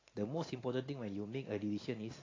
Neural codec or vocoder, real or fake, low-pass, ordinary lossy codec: none; real; 7.2 kHz; AAC, 32 kbps